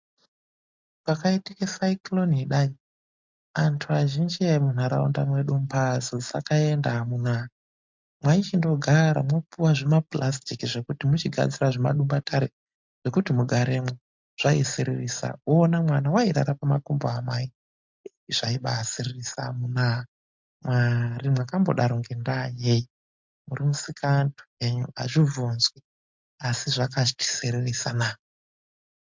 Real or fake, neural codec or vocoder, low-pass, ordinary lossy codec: real; none; 7.2 kHz; MP3, 64 kbps